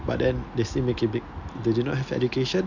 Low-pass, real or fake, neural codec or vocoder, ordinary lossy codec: 7.2 kHz; real; none; none